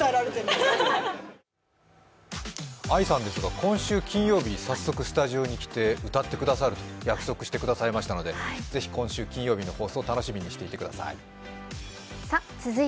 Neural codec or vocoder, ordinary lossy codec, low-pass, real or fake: none; none; none; real